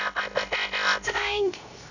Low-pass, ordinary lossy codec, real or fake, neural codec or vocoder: 7.2 kHz; none; fake; codec, 16 kHz, 0.3 kbps, FocalCodec